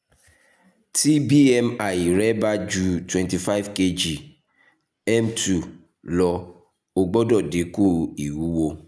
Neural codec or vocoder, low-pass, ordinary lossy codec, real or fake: none; none; none; real